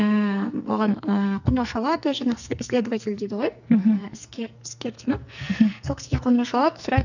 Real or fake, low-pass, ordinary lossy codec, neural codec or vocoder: fake; 7.2 kHz; none; codec, 44.1 kHz, 2.6 kbps, SNAC